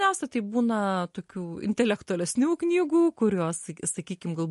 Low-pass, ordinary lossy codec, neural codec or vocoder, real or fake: 14.4 kHz; MP3, 48 kbps; none; real